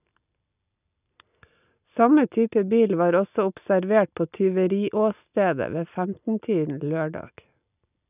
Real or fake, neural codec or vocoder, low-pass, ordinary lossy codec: real; none; 3.6 kHz; none